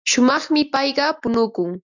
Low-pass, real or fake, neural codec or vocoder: 7.2 kHz; real; none